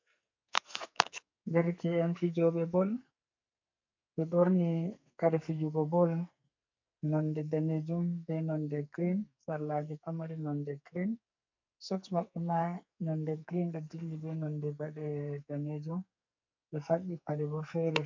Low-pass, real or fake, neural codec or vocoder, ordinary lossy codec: 7.2 kHz; fake; codec, 44.1 kHz, 2.6 kbps, SNAC; MP3, 64 kbps